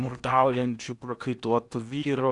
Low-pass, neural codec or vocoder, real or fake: 10.8 kHz; codec, 16 kHz in and 24 kHz out, 0.8 kbps, FocalCodec, streaming, 65536 codes; fake